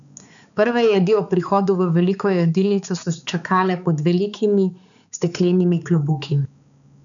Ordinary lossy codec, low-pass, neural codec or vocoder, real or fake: none; 7.2 kHz; codec, 16 kHz, 4 kbps, X-Codec, HuBERT features, trained on balanced general audio; fake